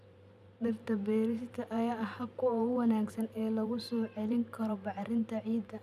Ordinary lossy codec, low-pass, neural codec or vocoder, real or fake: none; 14.4 kHz; vocoder, 44.1 kHz, 128 mel bands every 512 samples, BigVGAN v2; fake